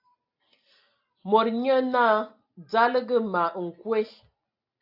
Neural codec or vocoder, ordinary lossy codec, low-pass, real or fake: none; AAC, 48 kbps; 5.4 kHz; real